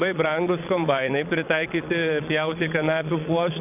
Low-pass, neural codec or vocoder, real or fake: 3.6 kHz; vocoder, 22.05 kHz, 80 mel bands, Vocos; fake